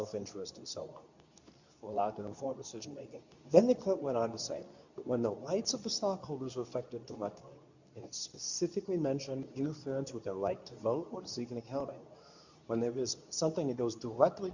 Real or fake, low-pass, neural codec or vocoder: fake; 7.2 kHz; codec, 24 kHz, 0.9 kbps, WavTokenizer, medium speech release version 2